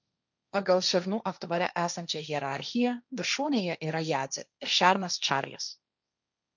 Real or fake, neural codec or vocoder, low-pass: fake; codec, 16 kHz, 1.1 kbps, Voila-Tokenizer; 7.2 kHz